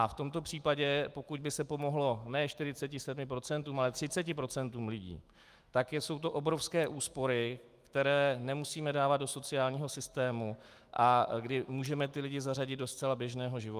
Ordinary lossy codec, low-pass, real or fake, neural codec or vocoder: Opus, 32 kbps; 14.4 kHz; fake; autoencoder, 48 kHz, 128 numbers a frame, DAC-VAE, trained on Japanese speech